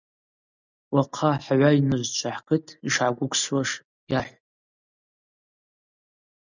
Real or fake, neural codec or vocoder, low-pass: real; none; 7.2 kHz